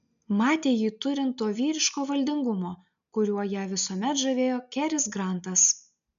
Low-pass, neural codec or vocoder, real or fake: 7.2 kHz; none; real